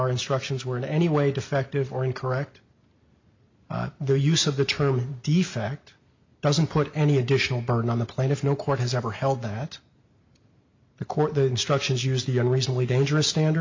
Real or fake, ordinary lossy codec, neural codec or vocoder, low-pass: real; MP3, 48 kbps; none; 7.2 kHz